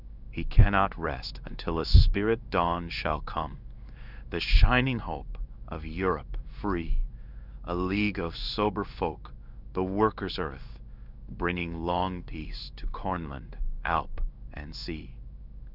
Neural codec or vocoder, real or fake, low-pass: codec, 16 kHz in and 24 kHz out, 1 kbps, XY-Tokenizer; fake; 5.4 kHz